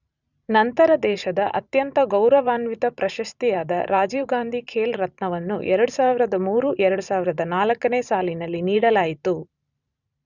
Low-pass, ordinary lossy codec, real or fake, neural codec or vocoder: 7.2 kHz; none; fake; vocoder, 44.1 kHz, 128 mel bands every 512 samples, BigVGAN v2